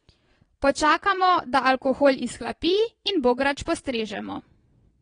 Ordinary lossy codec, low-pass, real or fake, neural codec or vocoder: AAC, 32 kbps; 9.9 kHz; fake; vocoder, 22.05 kHz, 80 mel bands, Vocos